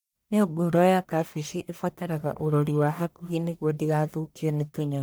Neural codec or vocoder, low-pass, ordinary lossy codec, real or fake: codec, 44.1 kHz, 1.7 kbps, Pupu-Codec; none; none; fake